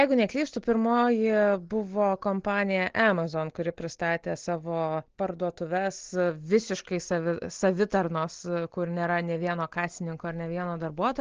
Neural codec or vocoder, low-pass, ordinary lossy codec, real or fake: none; 7.2 kHz; Opus, 16 kbps; real